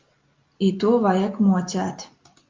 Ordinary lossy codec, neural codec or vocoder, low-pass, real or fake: Opus, 24 kbps; none; 7.2 kHz; real